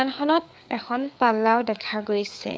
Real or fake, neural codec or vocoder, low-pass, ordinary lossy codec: fake; codec, 16 kHz, 4 kbps, FreqCodec, larger model; none; none